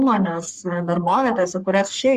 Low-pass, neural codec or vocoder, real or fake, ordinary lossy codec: 14.4 kHz; codec, 44.1 kHz, 3.4 kbps, Pupu-Codec; fake; Opus, 64 kbps